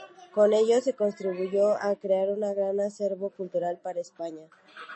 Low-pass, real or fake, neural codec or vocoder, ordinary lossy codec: 9.9 kHz; real; none; MP3, 32 kbps